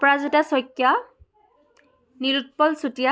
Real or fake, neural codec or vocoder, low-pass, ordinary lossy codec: real; none; none; none